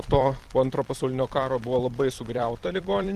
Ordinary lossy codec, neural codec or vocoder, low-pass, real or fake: Opus, 24 kbps; vocoder, 44.1 kHz, 128 mel bands every 256 samples, BigVGAN v2; 14.4 kHz; fake